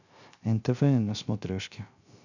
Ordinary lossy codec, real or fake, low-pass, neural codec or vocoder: none; fake; 7.2 kHz; codec, 16 kHz, 0.3 kbps, FocalCodec